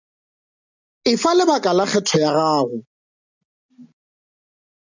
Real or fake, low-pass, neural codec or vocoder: real; 7.2 kHz; none